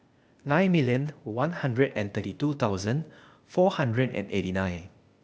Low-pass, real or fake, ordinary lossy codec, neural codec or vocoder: none; fake; none; codec, 16 kHz, 0.8 kbps, ZipCodec